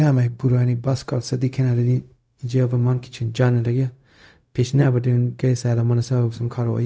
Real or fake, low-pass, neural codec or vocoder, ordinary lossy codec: fake; none; codec, 16 kHz, 0.4 kbps, LongCat-Audio-Codec; none